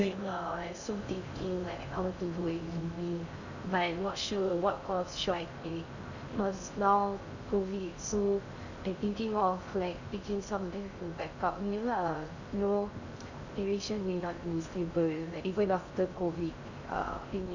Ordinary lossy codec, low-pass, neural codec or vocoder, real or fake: none; 7.2 kHz; codec, 16 kHz in and 24 kHz out, 0.6 kbps, FocalCodec, streaming, 4096 codes; fake